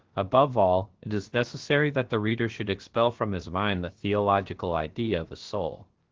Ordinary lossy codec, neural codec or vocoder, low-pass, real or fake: Opus, 16 kbps; codec, 16 kHz, about 1 kbps, DyCAST, with the encoder's durations; 7.2 kHz; fake